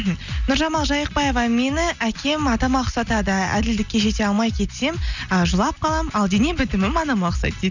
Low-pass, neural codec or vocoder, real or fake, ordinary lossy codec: 7.2 kHz; none; real; none